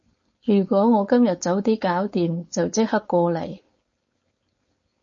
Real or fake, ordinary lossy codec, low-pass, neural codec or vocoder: fake; MP3, 32 kbps; 7.2 kHz; codec, 16 kHz, 4.8 kbps, FACodec